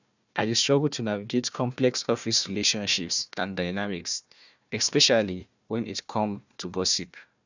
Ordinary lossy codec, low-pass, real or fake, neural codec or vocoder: none; 7.2 kHz; fake; codec, 16 kHz, 1 kbps, FunCodec, trained on Chinese and English, 50 frames a second